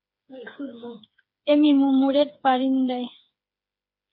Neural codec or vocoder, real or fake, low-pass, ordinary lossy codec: codec, 16 kHz, 4 kbps, FreqCodec, smaller model; fake; 5.4 kHz; MP3, 32 kbps